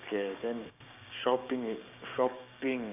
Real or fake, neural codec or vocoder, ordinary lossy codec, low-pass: fake; codec, 16 kHz, 6 kbps, DAC; none; 3.6 kHz